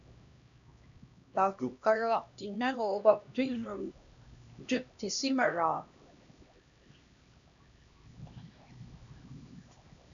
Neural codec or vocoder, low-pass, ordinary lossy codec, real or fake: codec, 16 kHz, 1 kbps, X-Codec, HuBERT features, trained on LibriSpeech; 7.2 kHz; MP3, 96 kbps; fake